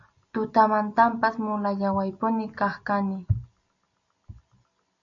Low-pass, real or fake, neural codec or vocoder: 7.2 kHz; real; none